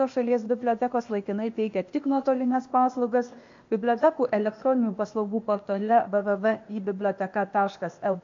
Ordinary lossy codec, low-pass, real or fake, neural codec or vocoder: MP3, 48 kbps; 7.2 kHz; fake; codec, 16 kHz, 0.8 kbps, ZipCodec